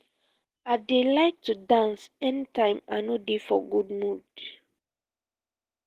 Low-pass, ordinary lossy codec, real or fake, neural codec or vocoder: 14.4 kHz; Opus, 16 kbps; real; none